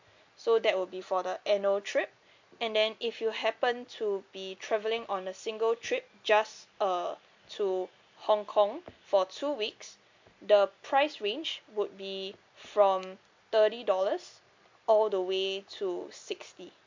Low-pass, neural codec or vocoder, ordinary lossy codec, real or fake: 7.2 kHz; none; MP3, 48 kbps; real